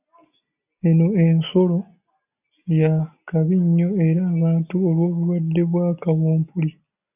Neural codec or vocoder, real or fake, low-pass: none; real; 3.6 kHz